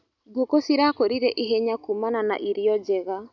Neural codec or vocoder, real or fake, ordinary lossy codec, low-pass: none; real; none; 7.2 kHz